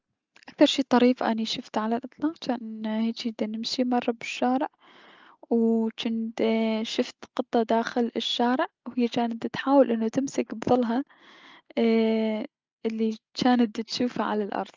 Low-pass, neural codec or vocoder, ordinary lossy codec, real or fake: 7.2 kHz; none; Opus, 32 kbps; real